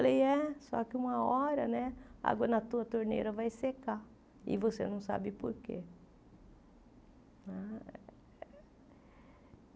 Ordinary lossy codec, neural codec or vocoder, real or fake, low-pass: none; none; real; none